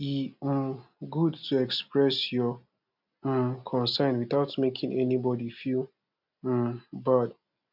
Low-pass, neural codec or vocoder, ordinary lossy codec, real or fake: 5.4 kHz; none; none; real